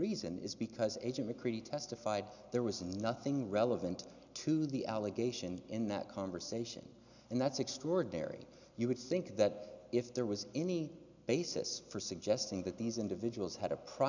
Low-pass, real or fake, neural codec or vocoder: 7.2 kHz; real; none